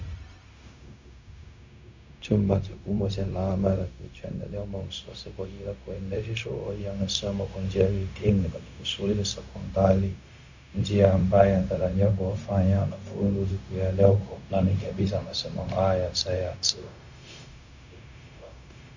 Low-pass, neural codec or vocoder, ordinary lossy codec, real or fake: 7.2 kHz; codec, 16 kHz, 0.4 kbps, LongCat-Audio-Codec; MP3, 64 kbps; fake